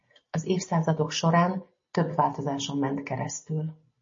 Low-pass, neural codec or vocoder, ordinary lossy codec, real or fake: 7.2 kHz; none; MP3, 32 kbps; real